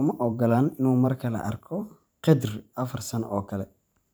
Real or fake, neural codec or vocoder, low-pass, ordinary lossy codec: fake; vocoder, 44.1 kHz, 128 mel bands every 512 samples, BigVGAN v2; none; none